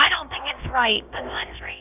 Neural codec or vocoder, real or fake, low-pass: codec, 16 kHz, about 1 kbps, DyCAST, with the encoder's durations; fake; 3.6 kHz